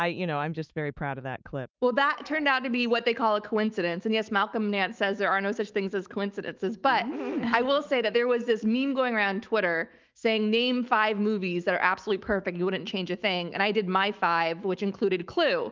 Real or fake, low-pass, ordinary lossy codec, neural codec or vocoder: fake; 7.2 kHz; Opus, 24 kbps; autoencoder, 48 kHz, 128 numbers a frame, DAC-VAE, trained on Japanese speech